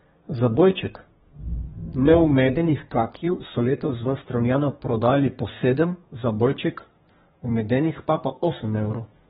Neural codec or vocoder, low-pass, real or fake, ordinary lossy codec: codec, 32 kHz, 1.9 kbps, SNAC; 14.4 kHz; fake; AAC, 16 kbps